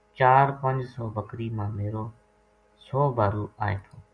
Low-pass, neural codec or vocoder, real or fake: 9.9 kHz; none; real